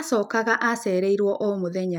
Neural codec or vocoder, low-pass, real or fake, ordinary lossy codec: none; 19.8 kHz; real; none